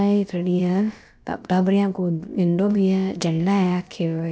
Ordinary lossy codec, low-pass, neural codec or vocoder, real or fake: none; none; codec, 16 kHz, about 1 kbps, DyCAST, with the encoder's durations; fake